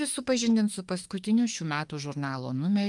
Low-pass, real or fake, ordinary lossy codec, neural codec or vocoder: 10.8 kHz; fake; Opus, 32 kbps; autoencoder, 48 kHz, 32 numbers a frame, DAC-VAE, trained on Japanese speech